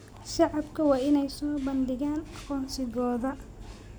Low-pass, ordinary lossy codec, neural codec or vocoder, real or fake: none; none; none; real